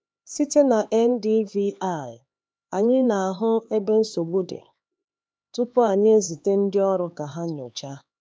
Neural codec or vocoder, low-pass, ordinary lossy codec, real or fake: codec, 16 kHz, 4 kbps, X-Codec, HuBERT features, trained on LibriSpeech; none; none; fake